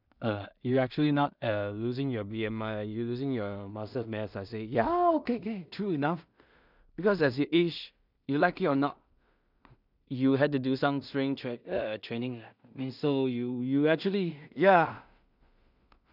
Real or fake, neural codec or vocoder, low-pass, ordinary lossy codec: fake; codec, 16 kHz in and 24 kHz out, 0.4 kbps, LongCat-Audio-Codec, two codebook decoder; 5.4 kHz; none